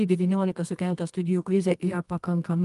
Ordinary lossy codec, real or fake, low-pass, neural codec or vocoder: Opus, 32 kbps; fake; 10.8 kHz; codec, 24 kHz, 0.9 kbps, WavTokenizer, medium music audio release